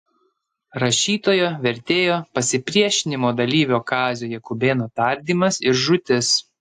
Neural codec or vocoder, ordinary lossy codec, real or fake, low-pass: none; AAC, 64 kbps; real; 14.4 kHz